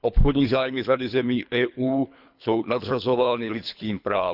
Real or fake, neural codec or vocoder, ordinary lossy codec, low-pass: fake; codec, 24 kHz, 3 kbps, HILCodec; none; 5.4 kHz